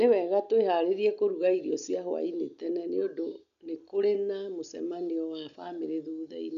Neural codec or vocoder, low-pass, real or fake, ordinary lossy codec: none; 7.2 kHz; real; none